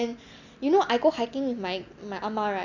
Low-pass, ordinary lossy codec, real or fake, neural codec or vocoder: 7.2 kHz; none; real; none